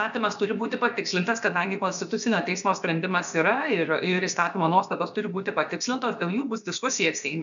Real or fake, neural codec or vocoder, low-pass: fake; codec, 16 kHz, about 1 kbps, DyCAST, with the encoder's durations; 7.2 kHz